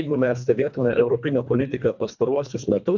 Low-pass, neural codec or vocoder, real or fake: 7.2 kHz; codec, 24 kHz, 1.5 kbps, HILCodec; fake